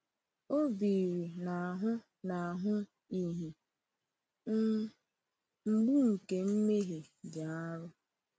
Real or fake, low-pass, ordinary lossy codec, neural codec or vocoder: real; none; none; none